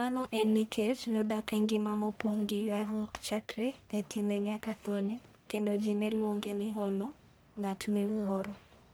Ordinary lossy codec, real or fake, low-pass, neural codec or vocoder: none; fake; none; codec, 44.1 kHz, 1.7 kbps, Pupu-Codec